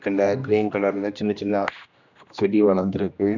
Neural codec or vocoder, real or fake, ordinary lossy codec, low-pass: codec, 16 kHz, 1 kbps, X-Codec, HuBERT features, trained on general audio; fake; none; 7.2 kHz